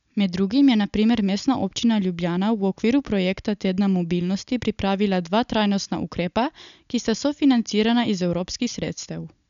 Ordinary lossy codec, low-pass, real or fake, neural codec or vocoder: MP3, 96 kbps; 7.2 kHz; real; none